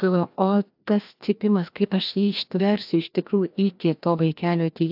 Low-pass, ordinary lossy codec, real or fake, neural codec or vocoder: 5.4 kHz; AAC, 48 kbps; fake; codec, 16 kHz, 1 kbps, FreqCodec, larger model